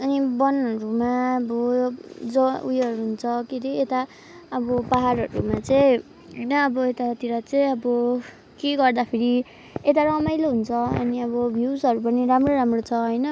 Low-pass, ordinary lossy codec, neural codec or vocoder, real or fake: none; none; none; real